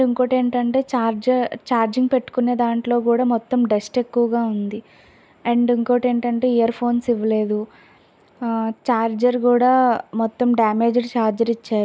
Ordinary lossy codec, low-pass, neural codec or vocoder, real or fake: none; none; none; real